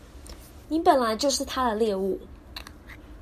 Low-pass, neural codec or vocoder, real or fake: 14.4 kHz; none; real